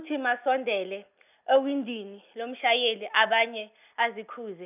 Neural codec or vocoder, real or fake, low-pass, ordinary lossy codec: none; real; 3.6 kHz; none